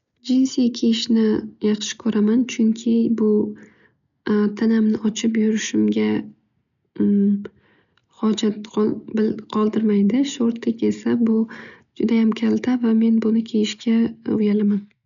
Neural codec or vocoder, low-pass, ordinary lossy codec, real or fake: none; 7.2 kHz; none; real